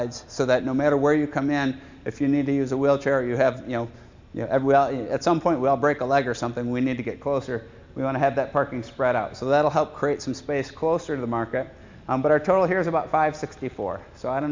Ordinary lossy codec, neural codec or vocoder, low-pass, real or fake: MP3, 64 kbps; none; 7.2 kHz; real